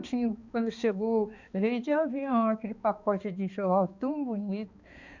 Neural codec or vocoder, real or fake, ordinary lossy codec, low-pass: codec, 16 kHz, 2 kbps, X-Codec, HuBERT features, trained on balanced general audio; fake; Opus, 64 kbps; 7.2 kHz